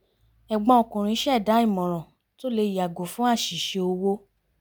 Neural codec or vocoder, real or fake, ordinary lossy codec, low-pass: none; real; none; none